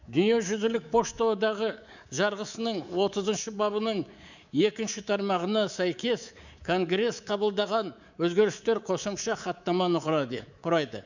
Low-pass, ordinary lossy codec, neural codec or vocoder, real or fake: 7.2 kHz; none; codec, 24 kHz, 3.1 kbps, DualCodec; fake